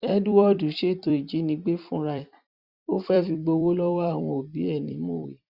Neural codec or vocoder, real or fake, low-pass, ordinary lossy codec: vocoder, 44.1 kHz, 128 mel bands every 256 samples, BigVGAN v2; fake; 5.4 kHz; Opus, 64 kbps